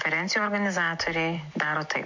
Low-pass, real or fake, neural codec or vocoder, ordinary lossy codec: 7.2 kHz; real; none; MP3, 48 kbps